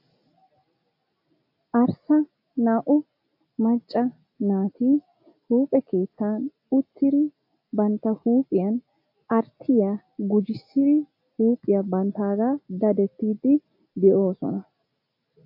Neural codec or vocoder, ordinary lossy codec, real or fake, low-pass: none; AAC, 48 kbps; real; 5.4 kHz